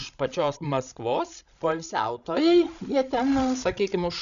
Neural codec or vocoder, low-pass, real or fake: codec, 16 kHz, 16 kbps, FreqCodec, larger model; 7.2 kHz; fake